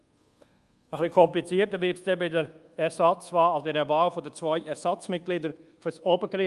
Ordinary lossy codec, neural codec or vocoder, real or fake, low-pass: Opus, 32 kbps; codec, 24 kHz, 1.2 kbps, DualCodec; fake; 10.8 kHz